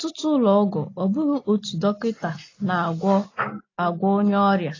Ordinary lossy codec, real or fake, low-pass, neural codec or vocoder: AAC, 32 kbps; real; 7.2 kHz; none